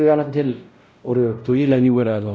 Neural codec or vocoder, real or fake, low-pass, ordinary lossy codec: codec, 16 kHz, 0.5 kbps, X-Codec, WavLM features, trained on Multilingual LibriSpeech; fake; none; none